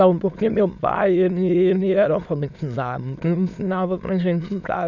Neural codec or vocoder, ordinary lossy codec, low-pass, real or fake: autoencoder, 22.05 kHz, a latent of 192 numbers a frame, VITS, trained on many speakers; none; 7.2 kHz; fake